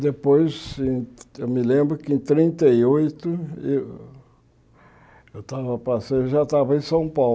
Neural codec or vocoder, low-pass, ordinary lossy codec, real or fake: none; none; none; real